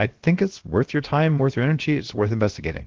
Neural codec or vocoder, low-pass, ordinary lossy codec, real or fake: codec, 16 kHz, 0.7 kbps, FocalCodec; 7.2 kHz; Opus, 16 kbps; fake